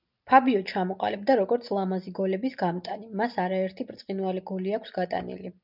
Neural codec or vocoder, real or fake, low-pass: none; real; 5.4 kHz